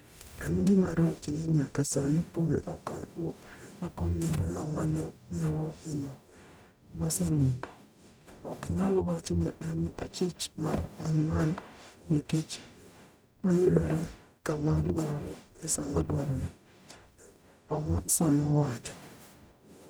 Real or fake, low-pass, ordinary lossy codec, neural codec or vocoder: fake; none; none; codec, 44.1 kHz, 0.9 kbps, DAC